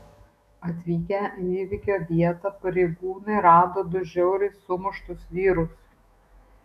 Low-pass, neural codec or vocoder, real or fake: 14.4 kHz; autoencoder, 48 kHz, 128 numbers a frame, DAC-VAE, trained on Japanese speech; fake